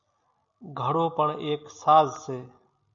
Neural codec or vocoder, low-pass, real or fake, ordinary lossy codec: none; 7.2 kHz; real; MP3, 96 kbps